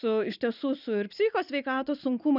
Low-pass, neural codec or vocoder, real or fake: 5.4 kHz; none; real